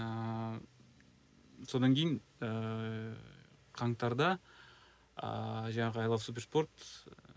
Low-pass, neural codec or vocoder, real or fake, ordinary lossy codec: none; none; real; none